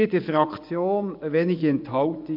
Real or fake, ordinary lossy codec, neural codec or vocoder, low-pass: fake; MP3, 48 kbps; vocoder, 24 kHz, 100 mel bands, Vocos; 5.4 kHz